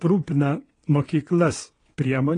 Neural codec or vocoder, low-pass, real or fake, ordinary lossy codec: vocoder, 22.05 kHz, 80 mel bands, WaveNeXt; 9.9 kHz; fake; AAC, 32 kbps